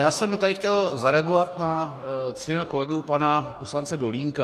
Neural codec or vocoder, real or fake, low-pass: codec, 44.1 kHz, 2.6 kbps, DAC; fake; 14.4 kHz